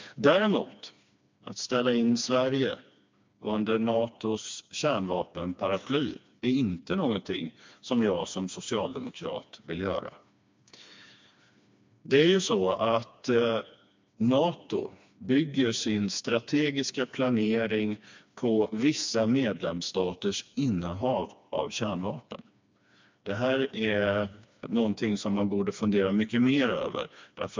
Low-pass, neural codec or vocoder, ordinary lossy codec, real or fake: 7.2 kHz; codec, 16 kHz, 2 kbps, FreqCodec, smaller model; MP3, 64 kbps; fake